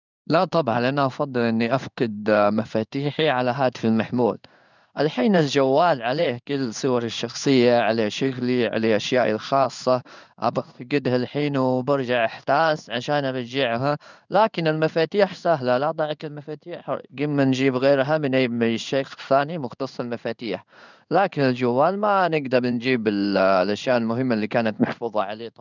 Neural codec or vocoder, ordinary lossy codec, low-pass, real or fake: codec, 16 kHz in and 24 kHz out, 1 kbps, XY-Tokenizer; none; 7.2 kHz; fake